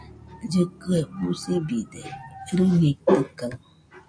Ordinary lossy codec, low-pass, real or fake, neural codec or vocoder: AAC, 64 kbps; 9.9 kHz; real; none